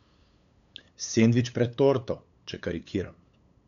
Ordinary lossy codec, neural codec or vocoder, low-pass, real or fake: none; codec, 16 kHz, 8 kbps, FunCodec, trained on LibriTTS, 25 frames a second; 7.2 kHz; fake